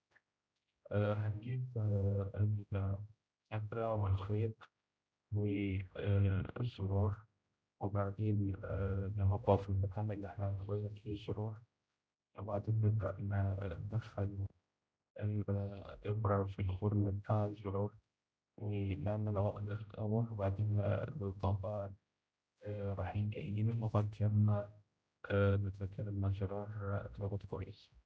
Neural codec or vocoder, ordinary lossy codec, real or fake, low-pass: codec, 16 kHz, 0.5 kbps, X-Codec, HuBERT features, trained on general audio; none; fake; none